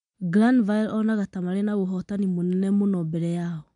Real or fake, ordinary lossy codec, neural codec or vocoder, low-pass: real; MP3, 64 kbps; none; 9.9 kHz